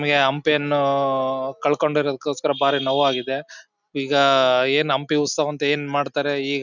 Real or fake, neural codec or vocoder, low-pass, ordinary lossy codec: real; none; 7.2 kHz; none